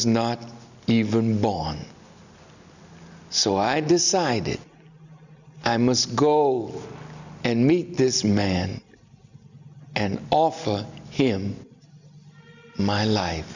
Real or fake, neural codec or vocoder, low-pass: real; none; 7.2 kHz